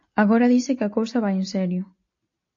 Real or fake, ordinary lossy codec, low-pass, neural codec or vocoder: real; AAC, 48 kbps; 7.2 kHz; none